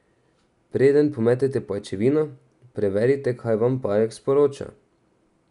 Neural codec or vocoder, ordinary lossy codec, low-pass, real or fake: none; none; 10.8 kHz; real